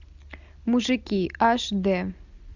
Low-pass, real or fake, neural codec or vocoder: 7.2 kHz; real; none